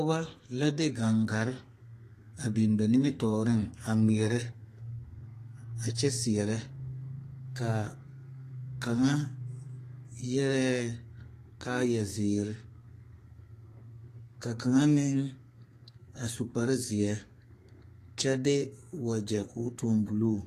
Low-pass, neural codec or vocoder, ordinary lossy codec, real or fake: 14.4 kHz; codec, 32 kHz, 1.9 kbps, SNAC; AAC, 48 kbps; fake